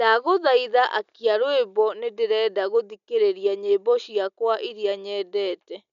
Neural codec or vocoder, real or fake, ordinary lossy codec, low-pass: none; real; none; 7.2 kHz